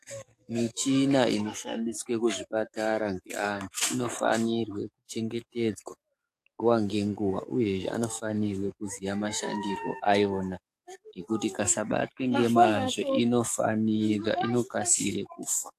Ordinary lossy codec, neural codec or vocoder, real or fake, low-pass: AAC, 64 kbps; codec, 44.1 kHz, 7.8 kbps, DAC; fake; 14.4 kHz